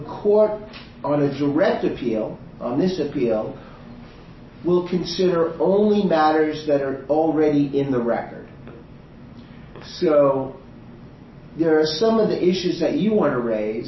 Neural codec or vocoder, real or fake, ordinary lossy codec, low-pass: none; real; MP3, 24 kbps; 7.2 kHz